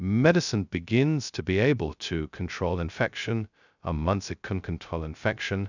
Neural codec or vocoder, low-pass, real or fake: codec, 16 kHz, 0.2 kbps, FocalCodec; 7.2 kHz; fake